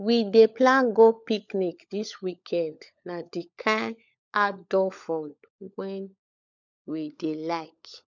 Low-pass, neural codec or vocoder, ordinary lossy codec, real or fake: 7.2 kHz; codec, 16 kHz, 16 kbps, FunCodec, trained on LibriTTS, 50 frames a second; none; fake